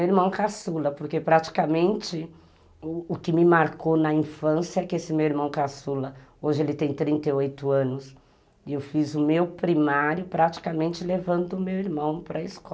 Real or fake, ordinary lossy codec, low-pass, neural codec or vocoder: real; none; none; none